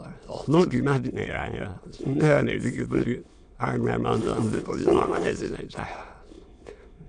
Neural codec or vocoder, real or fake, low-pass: autoencoder, 22.05 kHz, a latent of 192 numbers a frame, VITS, trained on many speakers; fake; 9.9 kHz